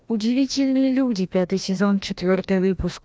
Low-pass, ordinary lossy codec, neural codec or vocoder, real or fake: none; none; codec, 16 kHz, 1 kbps, FreqCodec, larger model; fake